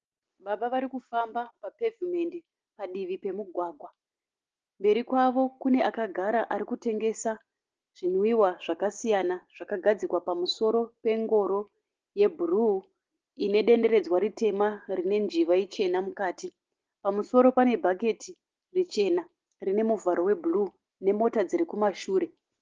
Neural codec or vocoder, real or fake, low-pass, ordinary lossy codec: none; real; 7.2 kHz; Opus, 16 kbps